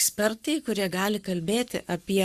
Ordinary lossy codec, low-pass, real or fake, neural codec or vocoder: Opus, 64 kbps; 14.4 kHz; fake; codec, 44.1 kHz, 7.8 kbps, Pupu-Codec